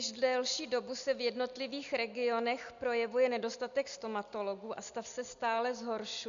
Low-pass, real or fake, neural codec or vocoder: 7.2 kHz; real; none